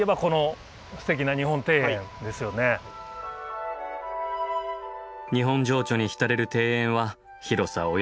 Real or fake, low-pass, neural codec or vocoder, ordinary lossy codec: real; none; none; none